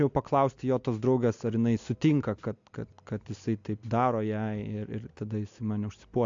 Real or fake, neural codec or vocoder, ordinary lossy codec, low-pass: real; none; AAC, 64 kbps; 7.2 kHz